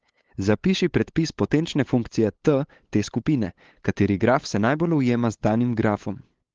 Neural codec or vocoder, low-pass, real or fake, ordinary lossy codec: codec, 16 kHz, 8 kbps, FunCodec, trained on LibriTTS, 25 frames a second; 7.2 kHz; fake; Opus, 16 kbps